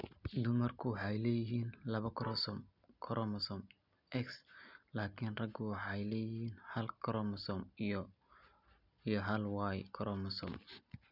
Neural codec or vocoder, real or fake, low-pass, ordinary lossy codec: none; real; 5.4 kHz; none